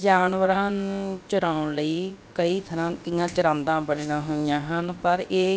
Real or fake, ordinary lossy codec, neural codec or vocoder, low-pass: fake; none; codec, 16 kHz, about 1 kbps, DyCAST, with the encoder's durations; none